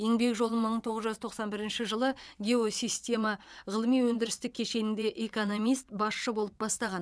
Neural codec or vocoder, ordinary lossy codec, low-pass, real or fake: vocoder, 22.05 kHz, 80 mel bands, WaveNeXt; none; none; fake